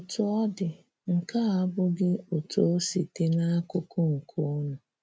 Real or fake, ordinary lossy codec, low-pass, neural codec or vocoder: real; none; none; none